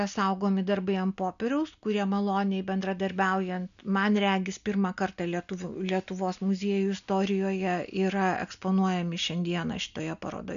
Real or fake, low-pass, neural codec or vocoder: real; 7.2 kHz; none